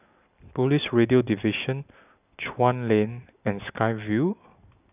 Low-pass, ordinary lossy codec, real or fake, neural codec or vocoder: 3.6 kHz; none; real; none